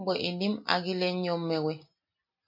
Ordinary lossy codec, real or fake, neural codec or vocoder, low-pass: MP3, 32 kbps; real; none; 5.4 kHz